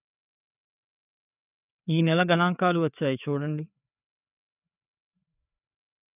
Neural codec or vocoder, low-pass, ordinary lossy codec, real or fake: codec, 16 kHz, 4 kbps, FreqCodec, larger model; 3.6 kHz; none; fake